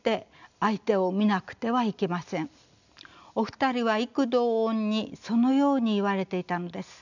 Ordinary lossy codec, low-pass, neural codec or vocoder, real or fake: none; 7.2 kHz; none; real